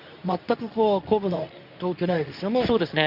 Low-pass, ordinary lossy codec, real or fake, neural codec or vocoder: 5.4 kHz; none; fake; codec, 24 kHz, 0.9 kbps, WavTokenizer, medium speech release version 2